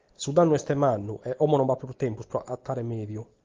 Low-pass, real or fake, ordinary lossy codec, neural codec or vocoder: 7.2 kHz; real; Opus, 32 kbps; none